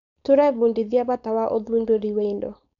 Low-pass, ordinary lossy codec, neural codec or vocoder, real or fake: 7.2 kHz; none; codec, 16 kHz, 4.8 kbps, FACodec; fake